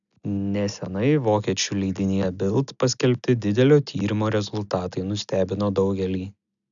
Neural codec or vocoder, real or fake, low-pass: none; real; 7.2 kHz